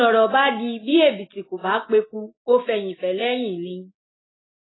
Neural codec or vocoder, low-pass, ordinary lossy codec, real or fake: none; 7.2 kHz; AAC, 16 kbps; real